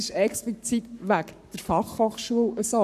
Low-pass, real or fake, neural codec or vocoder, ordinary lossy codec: 14.4 kHz; fake; codec, 44.1 kHz, 7.8 kbps, Pupu-Codec; none